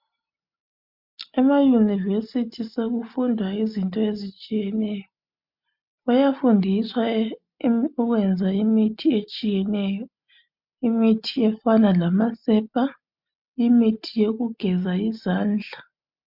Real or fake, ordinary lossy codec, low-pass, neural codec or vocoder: real; MP3, 48 kbps; 5.4 kHz; none